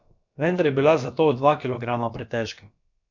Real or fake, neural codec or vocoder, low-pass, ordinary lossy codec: fake; codec, 16 kHz, about 1 kbps, DyCAST, with the encoder's durations; 7.2 kHz; none